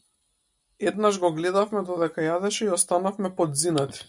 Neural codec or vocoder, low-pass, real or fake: none; 10.8 kHz; real